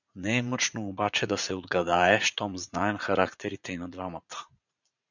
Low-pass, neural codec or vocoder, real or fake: 7.2 kHz; vocoder, 44.1 kHz, 80 mel bands, Vocos; fake